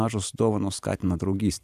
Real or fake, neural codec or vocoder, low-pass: fake; vocoder, 44.1 kHz, 128 mel bands every 512 samples, BigVGAN v2; 14.4 kHz